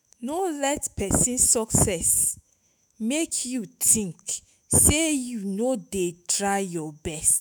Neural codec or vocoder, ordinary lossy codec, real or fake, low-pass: autoencoder, 48 kHz, 128 numbers a frame, DAC-VAE, trained on Japanese speech; none; fake; none